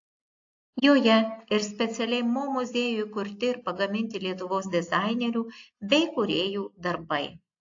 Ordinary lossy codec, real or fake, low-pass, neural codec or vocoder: AAC, 48 kbps; real; 7.2 kHz; none